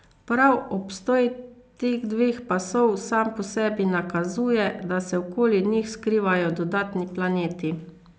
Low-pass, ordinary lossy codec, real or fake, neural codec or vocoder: none; none; real; none